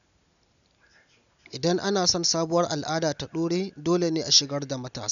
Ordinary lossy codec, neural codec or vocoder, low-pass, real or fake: none; none; 7.2 kHz; real